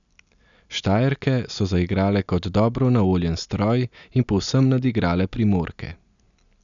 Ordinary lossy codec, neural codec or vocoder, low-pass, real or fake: none; none; 7.2 kHz; real